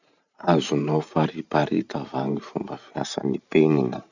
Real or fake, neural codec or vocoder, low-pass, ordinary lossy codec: fake; vocoder, 44.1 kHz, 128 mel bands every 512 samples, BigVGAN v2; 7.2 kHz; none